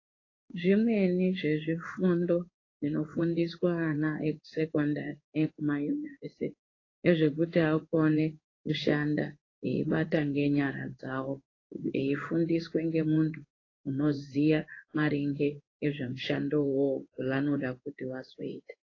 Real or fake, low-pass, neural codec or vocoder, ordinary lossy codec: fake; 7.2 kHz; codec, 16 kHz in and 24 kHz out, 1 kbps, XY-Tokenizer; AAC, 32 kbps